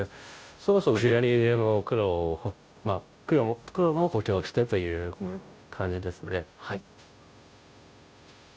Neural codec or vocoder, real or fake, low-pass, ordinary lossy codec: codec, 16 kHz, 0.5 kbps, FunCodec, trained on Chinese and English, 25 frames a second; fake; none; none